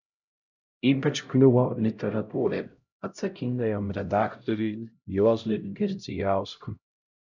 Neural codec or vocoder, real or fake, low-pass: codec, 16 kHz, 0.5 kbps, X-Codec, HuBERT features, trained on LibriSpeech; fake; 7.2 kHz